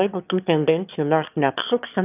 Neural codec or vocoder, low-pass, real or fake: autoencoder, 22.05 kHz, a latent of 192 numbers a frame, VITS, trained on one speaker; 3.6 kHz; fake